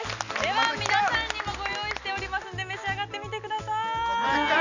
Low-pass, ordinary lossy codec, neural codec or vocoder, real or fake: 7.2 kHz; none; none; real